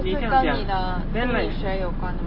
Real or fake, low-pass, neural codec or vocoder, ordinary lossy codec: real; 5.4 kHz; none; MP3, 24 kbps